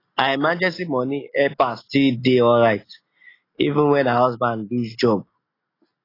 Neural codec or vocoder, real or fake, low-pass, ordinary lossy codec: none; real; 5.4 kHz; AAC, 24 kbps